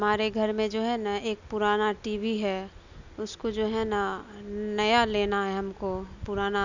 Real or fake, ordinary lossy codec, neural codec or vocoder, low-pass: real; none; none; 7.2 kHz